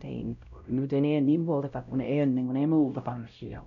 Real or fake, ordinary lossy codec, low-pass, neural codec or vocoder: fake; none; 7.2 kHz; codec, 16 kHz, 0.5 kbps, X-Codec, WavLM features, trained on Multilingual LibriSpeech